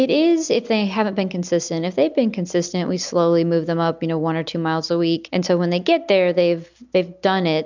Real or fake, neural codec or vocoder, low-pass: real; none; 7.2 kHz